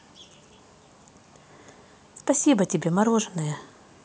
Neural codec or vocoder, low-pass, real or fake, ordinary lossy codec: none; none; real; none